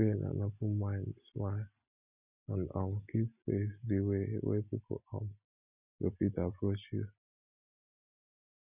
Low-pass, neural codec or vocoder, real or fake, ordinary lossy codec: 3.6 kHz; none; real; none